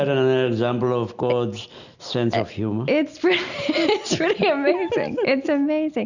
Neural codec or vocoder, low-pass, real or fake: none; 7.2 kHz; real